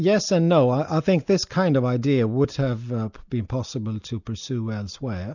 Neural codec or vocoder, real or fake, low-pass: none; real; 7.2 kHz